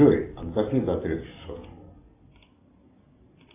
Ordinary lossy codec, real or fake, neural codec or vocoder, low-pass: AAC, 24 kbps; real; none; 3.6 kHz